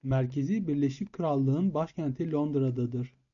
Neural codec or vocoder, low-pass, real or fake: none; 7.2 kHz; real